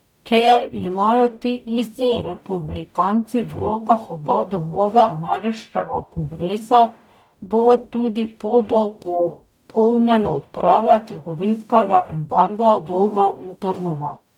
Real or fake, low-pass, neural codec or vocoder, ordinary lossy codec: fake; 19.8 kHz; codec, 44.1 kHz, 0.9 kbps, DAC; none